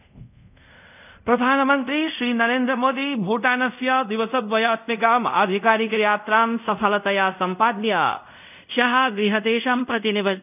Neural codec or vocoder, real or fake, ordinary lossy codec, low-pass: codec, 24 kHz, 0.5 kbps, DualCodec; fake; none; 3.6 kHz